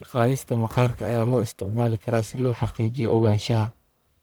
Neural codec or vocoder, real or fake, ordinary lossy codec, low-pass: codec, 44.1 kHz, 1.7 kbps, Pupu-Codec; fake; none; none